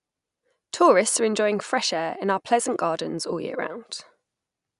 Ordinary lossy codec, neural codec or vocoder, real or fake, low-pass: none; none; real; 10.8 kHz